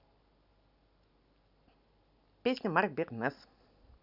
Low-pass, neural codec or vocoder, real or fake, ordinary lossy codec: 5.4 kHz; none; real; none